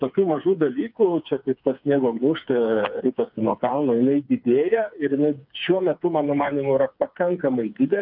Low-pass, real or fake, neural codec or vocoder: 5.4 kHz; fake; codec, 16 kHz, 4 kbps, FreqCodec, smaller model